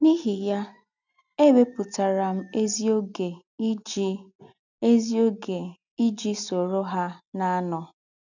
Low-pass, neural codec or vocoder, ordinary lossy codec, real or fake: 7.2 kHz; none; none; real